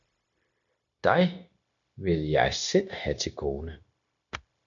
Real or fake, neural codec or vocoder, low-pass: fake; codec, 16 kHz, 0.9 kbps, LongCat-Audio-Codec; 7.2 kHz